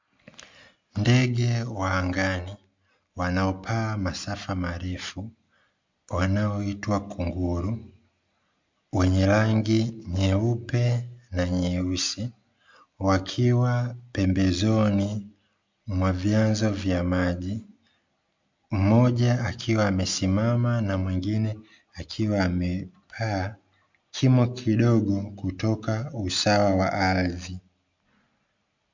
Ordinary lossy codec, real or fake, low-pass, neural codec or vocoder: MP3, 64 kbps; real; 7.2 kHz; none